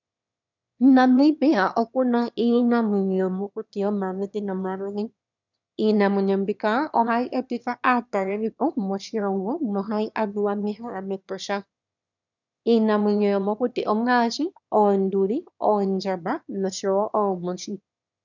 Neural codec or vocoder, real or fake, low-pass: autoencoder, 22.05 kHz, a latent of 192 numbers a frame, VITS, trained on one speaker; fake; 7.2 kHz